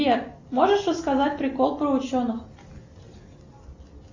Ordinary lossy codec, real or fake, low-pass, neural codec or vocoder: Opus, 64 kbps; real; 7.2 kHz; none